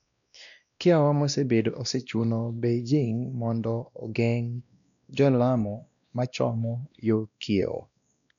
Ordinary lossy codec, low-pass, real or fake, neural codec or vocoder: none; 7.2 kHz; fake; codec, 16 kHz, 1 kbps, X-Codec, WavLM features, trained on Multilingual LibriSpeech